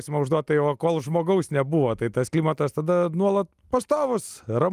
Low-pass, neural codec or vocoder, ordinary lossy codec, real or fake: 14.4 kHz; none; Opus, 32 kbps; real